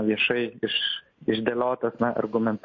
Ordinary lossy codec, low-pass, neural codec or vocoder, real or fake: MP3, 32 kbps; 7.2 kHz; none; real